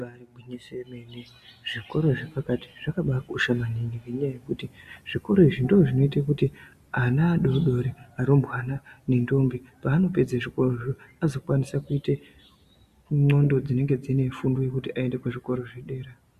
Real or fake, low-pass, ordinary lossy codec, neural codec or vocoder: real; 14.4 kHz; Opus, 64 kbps; none